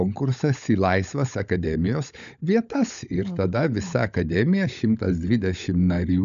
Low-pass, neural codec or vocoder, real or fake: 7.2 kHz; codec, 16 kHz, 16 kbps, FunCodec, trained on LibriTTS, 50 frames a second; fake